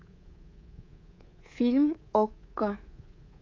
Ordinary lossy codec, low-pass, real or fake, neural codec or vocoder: AAC, 32 kbps; 7.2 kHz; fake; codec, 24 kHz, 3.1 kbps, DualCodec